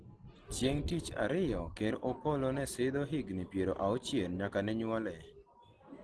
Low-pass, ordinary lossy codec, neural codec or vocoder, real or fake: 10.8 kHz; Opus, 16 kbps; none; real